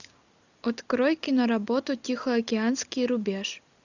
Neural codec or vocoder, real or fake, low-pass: none; real; 7.2 kHz